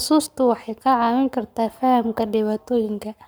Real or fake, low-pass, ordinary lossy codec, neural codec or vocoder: fake; none; none; codec, 44.1 kHz, 7.8 kbps, Pupu-Codec